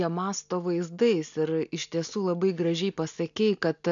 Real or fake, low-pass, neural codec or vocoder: real; 7.2 kHz; none